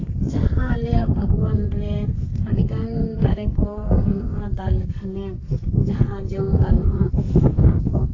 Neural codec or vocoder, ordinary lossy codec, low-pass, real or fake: codec, 32 kHz, 1.9 kbps, SNAC; AAC, 32 kbps; 7.2 kHz; fake